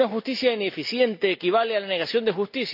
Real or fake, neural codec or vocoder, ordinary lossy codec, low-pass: real; none; none; 5.4 kHz